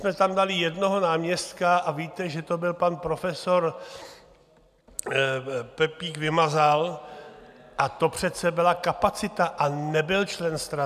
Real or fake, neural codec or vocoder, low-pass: real; none; 14.4 kHz